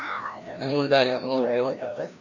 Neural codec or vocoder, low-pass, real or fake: codec, 16 kHz, 1 kbps, FreqCodec, larger model; 7.2 kHz; fake